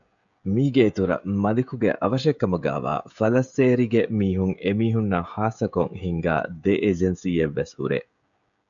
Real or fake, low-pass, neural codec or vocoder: fake; 7.2 kHz; codec, 16 kHz, 16 kbps, FreqCodec, smaller model